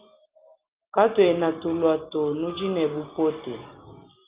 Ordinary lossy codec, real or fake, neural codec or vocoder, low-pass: Opus, 32 kbps; real; none; 3.6 kHz